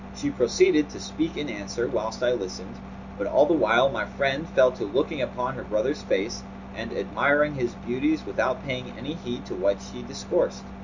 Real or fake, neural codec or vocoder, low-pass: real; none; 7.2 kHz